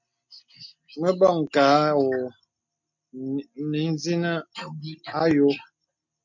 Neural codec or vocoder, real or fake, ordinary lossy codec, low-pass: none; real; MP3, 48 kbps; 7.2 kHz